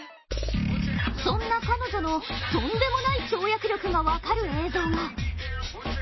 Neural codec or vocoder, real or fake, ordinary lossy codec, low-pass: none; real; MP3, 24 kbps; 7.2 kHz